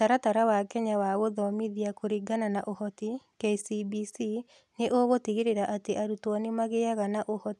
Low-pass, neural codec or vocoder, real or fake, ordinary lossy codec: none; none; real; none